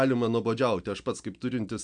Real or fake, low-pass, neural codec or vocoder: real; 9.9 kHz; none